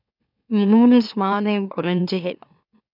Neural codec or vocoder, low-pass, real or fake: autoencoder, 44.1 kHz, a latent of 192 numbers a frame, MeloTTS; 5.4 kHz; fake